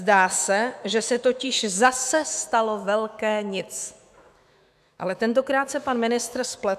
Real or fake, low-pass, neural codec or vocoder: fake; 14.4 kHz; autoencoder, 48 kHz, 128 numbers a frame, DAC-VAE, trained on Japanese speech